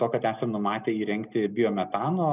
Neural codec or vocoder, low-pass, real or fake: none; 3.6 kHz; real